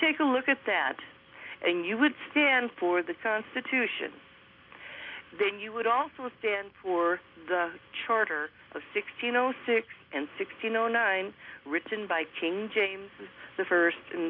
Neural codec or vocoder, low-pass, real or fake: none; 5.4 kHz; real